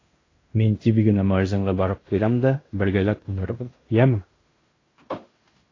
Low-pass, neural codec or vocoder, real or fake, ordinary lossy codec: 7.2 kHz; codec, 16 kHz in and 24 kHz out, 0.9 kbps, LongCat-Audio-Codec, fine tuned four codebook decoder; fake; AAC, 32 kbps